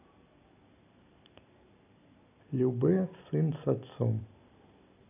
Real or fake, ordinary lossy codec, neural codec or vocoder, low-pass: real; none; none; 3.6 kHz